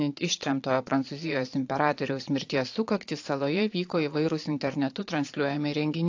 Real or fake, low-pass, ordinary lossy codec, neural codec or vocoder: fake; 7.2 kHz; AAC, 48 kbps; vocoder, 22.05 kHz, 80 mel bands, Vocos